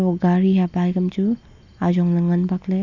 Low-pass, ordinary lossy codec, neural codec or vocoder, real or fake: 7.2 kHz; none; none; real